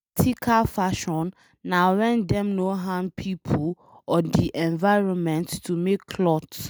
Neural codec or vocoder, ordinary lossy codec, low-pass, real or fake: none; none; none; real